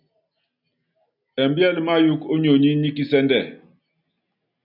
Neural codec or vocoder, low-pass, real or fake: none; 5.4 kHz; real